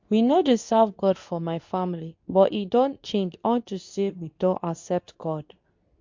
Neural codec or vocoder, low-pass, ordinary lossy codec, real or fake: codec, 24 kHz, 0.9 kbps, WavTokenizer, medium speech release version 2; 7.2 kHz; MP3, 48 kbps; fake